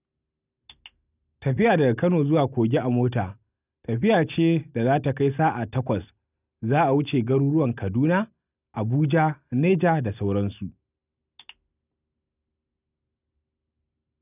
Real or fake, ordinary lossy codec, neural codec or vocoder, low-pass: real; none; none; 3.6 kHz